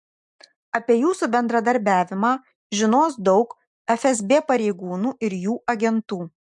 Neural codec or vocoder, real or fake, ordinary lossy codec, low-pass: none; real; MP3, 64 kbps; 10.8 kHz